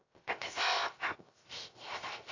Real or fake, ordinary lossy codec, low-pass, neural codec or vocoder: fake; AAC, 32 kbps; 7.2 kHz; codec, 16 kHz, 0.3 kbps, FocalCodec